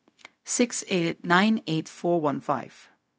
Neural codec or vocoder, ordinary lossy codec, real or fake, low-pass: codec, 16 kHz, 0.4 kbps, LongCat-Audio-Codec; none; fake; none